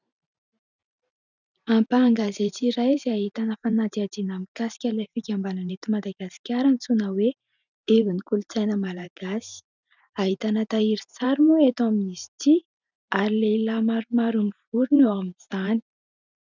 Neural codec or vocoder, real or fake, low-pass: vocoder, 44.1 kHz, 128 mel bands every 512 samples, BigVGAN v2; fake; 7.2 kHz